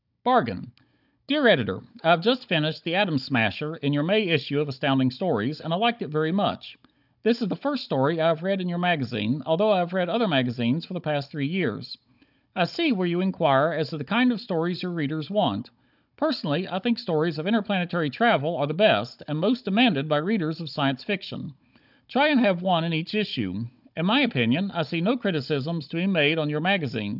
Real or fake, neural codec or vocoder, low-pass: fake; codec, 16 kHz, 16 kbps, FunCodec, trained on Chinese and English, 50 frames a second; 5.4 kHz